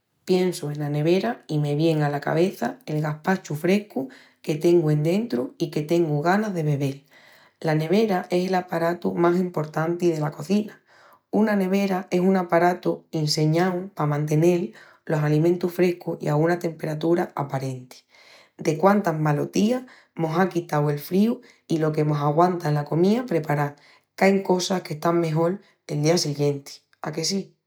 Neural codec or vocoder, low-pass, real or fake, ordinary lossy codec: none; none; real; none